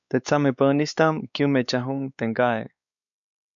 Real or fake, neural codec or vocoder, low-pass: fake; codec, 16 kHz, 4 kbps, X-Codec, WavLM features, trained on Multilingual LibriSpeech; 7.2 kHz